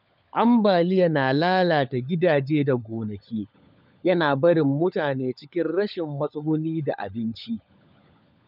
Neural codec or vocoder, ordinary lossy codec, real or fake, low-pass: codec, 16 kHz, 16 kbps, FunCodec, trained on LibriTTS, 50 frames a second; none; fake; 5.4 kHz